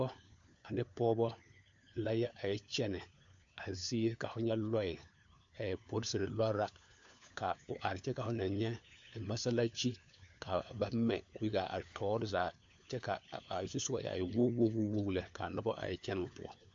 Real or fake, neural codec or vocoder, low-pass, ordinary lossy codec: fake; codec, 16 kHz, 4 kbps, FunCodec, trained on LibriTTS, 50 frames a second; 7.2 kHz; AAC, 64 kbps